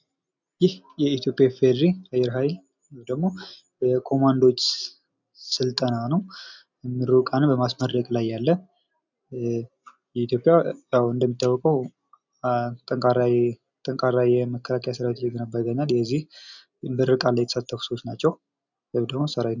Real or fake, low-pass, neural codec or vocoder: real; 7.2 kHz; none